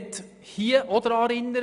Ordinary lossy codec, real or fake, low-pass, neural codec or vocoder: none; real; 10.8 kHz; none